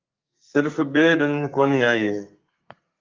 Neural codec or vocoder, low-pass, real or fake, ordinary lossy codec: codec, 32 kHz, 1.9 kbps, SNAC; 7.2 kHz; fake; Opus, 24 kbps